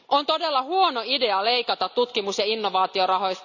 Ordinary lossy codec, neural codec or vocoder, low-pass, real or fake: none; none; 7.2 kHz; real